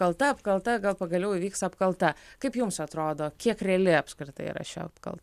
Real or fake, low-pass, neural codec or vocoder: real; 14.4 kHz; none